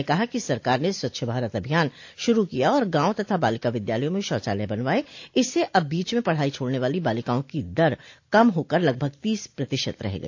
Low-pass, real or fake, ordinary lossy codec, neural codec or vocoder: 7.2 kHz; real; AAC, 48 kbps; none